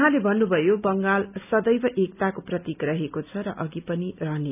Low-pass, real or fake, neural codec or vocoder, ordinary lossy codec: 3.6 kHz; real; none; none